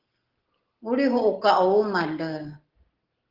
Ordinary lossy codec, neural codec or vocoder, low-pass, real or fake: Opus, 16 kbps; none; 5.4 kHz; real